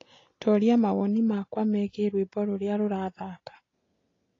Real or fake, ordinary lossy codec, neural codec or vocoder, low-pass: real; AAC, 32 kbps; none; 7.2 kHz